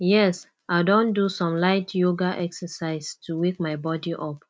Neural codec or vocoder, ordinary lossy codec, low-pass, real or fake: none; none; none; real